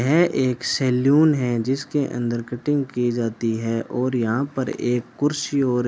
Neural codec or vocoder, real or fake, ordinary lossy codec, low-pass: none; real; none; none